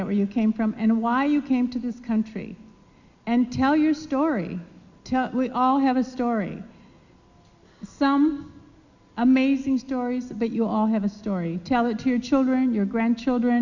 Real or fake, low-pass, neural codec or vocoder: real; 7.2 kHz; none